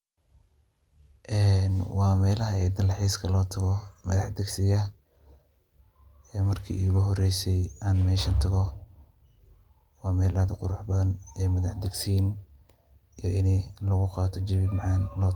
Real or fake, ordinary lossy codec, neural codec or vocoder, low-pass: real; Opus, 32 kbps; none; 19.8 kHz